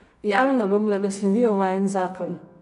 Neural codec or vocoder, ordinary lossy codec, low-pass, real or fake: codec, 24 kHz, 0.9 kbps, WavTokenizer, medium music audio release; none; 10.8 kHz; fake